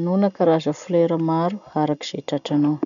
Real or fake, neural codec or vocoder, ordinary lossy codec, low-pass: real; none; none; 7.2 kHz